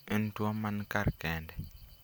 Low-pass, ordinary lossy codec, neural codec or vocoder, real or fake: none; none; none; real